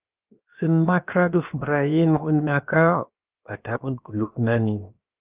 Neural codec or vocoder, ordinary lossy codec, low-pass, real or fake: codec, 16 kHz, 0.7 kbps, FocalCodec; Opus, 24 kbps; 3.6 kHz; fake